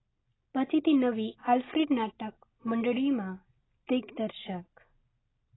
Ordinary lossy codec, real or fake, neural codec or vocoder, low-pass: AAC, 16 kbps; real; none; 7.2 kHz